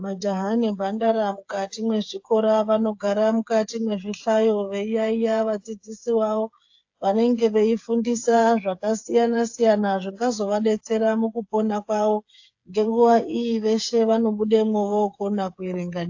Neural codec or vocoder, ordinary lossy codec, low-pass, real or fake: codec, 16 kHz, 8 kbps, FreqCodec, smaller model; AAC, 48 kbps; 7.2 kHz; fake